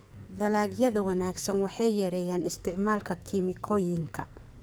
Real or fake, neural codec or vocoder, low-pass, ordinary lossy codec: fake; codec, 44.1 kHz, 2.6 kbps, SNAC; none; none